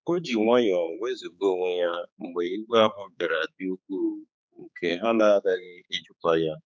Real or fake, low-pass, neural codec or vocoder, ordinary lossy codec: fake; none; codec, 16 kHz, 4 kbps, X-Codec, HuBERT features, trained on general audio; none